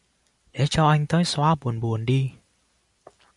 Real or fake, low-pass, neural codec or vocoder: real; 10.8 kHz; none